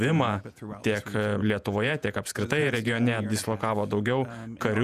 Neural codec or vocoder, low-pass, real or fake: vocoder, 48 kHz, 128 mel bands, Vocos; 14.4 kHz; fake